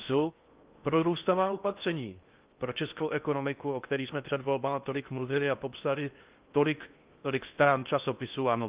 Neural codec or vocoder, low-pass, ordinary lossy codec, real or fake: codec, 16 kHz in and 24 kHz out, 0.6 kbps, FocalCodec, streaming, 4096 codes; 3.6 kHz; Opus, 24 kbps; fake